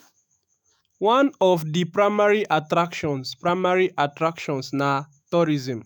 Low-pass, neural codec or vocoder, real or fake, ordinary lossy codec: none; autoencoder, 48 kHz, 128 numbers a frame, DAC-VAE, trained on Japanese speech; fake; none